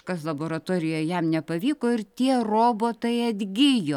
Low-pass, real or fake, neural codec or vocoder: 19.8 kHz; real; none